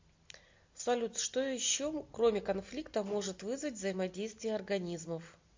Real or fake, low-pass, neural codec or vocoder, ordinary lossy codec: real; 7.2 kHz; none; MP3, 48 kbps